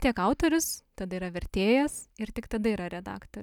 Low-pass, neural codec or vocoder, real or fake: 19.8 kHz; none; real